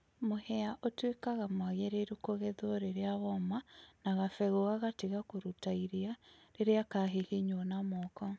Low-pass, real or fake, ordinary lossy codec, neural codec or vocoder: none; real; none; none